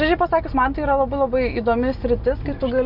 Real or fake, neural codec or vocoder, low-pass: real; none; 5.4 kHz